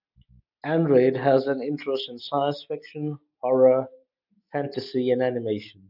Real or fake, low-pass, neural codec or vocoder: real; 5.4 kHz; none